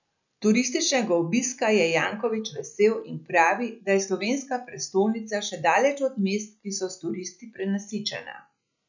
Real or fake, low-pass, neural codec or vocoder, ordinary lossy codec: real; 7.2 kHz; none; none